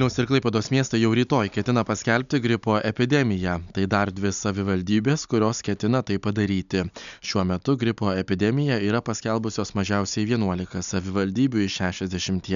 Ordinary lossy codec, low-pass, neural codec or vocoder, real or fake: MP3, 96 kbps; 7.2 kHz; none; real